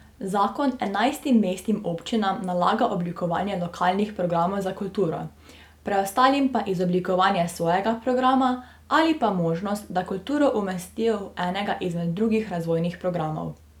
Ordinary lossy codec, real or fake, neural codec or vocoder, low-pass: none; real; none; 19.8 kHz